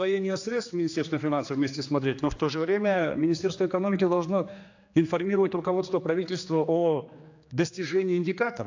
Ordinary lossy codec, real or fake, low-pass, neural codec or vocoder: AAC, 48 kbps; fake; 7.2 kHz; codec, 16 kHz, 2 kbps, X-Codec, HuBERT features, trained on general audio